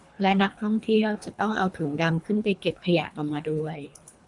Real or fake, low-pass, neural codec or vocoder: fake; 10.8 kHz; codec, 24 kHz, 3 kbps, HILCodec